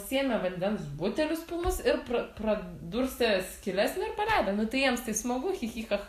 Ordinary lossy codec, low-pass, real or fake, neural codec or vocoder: AAC, 64 kbps; 14.4 kHz; real; none